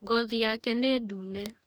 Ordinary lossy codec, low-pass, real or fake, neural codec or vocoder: none; none; fake; codec, 44.1 kHz, 2.6 kbps, DAC